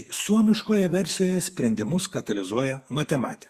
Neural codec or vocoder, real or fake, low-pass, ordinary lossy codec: codec, 44.1 kHz, 2.6 kbps, SNAC; fake; 14.4 kHz; Opus, 64 kbps